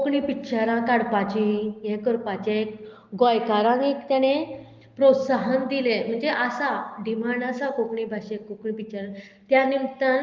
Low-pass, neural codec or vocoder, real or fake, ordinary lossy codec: 7.2 kHz; none; real; Opus, 32 kbps